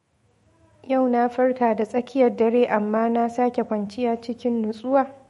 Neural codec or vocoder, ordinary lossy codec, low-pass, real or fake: autoencoder, 48 kHz, 128 numbers a frame, DAC-VAE, trained on Japanese speech; MP3, 48 kbps; 19.8 kHz; fake